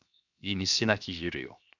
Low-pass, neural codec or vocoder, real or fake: 7.2 kHz; codec, 16 kHz, 0.7 kbps, FocalCodec; fake